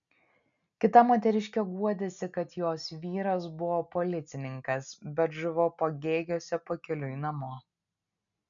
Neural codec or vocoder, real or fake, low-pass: none; real; 7.2 kHz